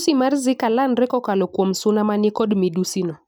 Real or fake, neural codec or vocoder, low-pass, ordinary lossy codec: real; none; none; none